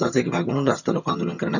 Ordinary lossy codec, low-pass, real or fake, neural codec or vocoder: none; 7.2 kHz; fake; vocoder, 22.05 kHz, 80 mel bands, HiFi-GAN